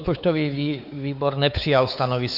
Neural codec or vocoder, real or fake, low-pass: codec, 16 kHz, 4 kbps, X-Codec, WavLM features, trained on Multilingual LibriSpeech; fake; 5.4 kHz